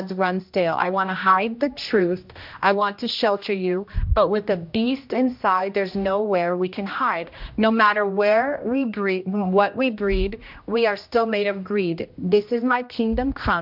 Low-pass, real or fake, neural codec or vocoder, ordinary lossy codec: 5.4 kHz; fake; codec, 16 kHz, 1 kbps, X-Codec, HuBERT features, trained on general audio; MP3, 48 kbps